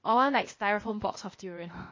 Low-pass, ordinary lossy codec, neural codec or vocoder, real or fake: 7.2 kHz; MP3, 32 kbps; codec, 16 kHz, 0.8 kbps, ZipCodec; fake